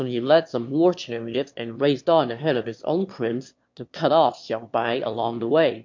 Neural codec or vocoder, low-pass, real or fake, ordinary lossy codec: autoencoder, 22.05 kHz, a latent of 192 numbers a frame, VITS, trained on one speaker; 7.2 kHz; fake; MP3, 48 kbps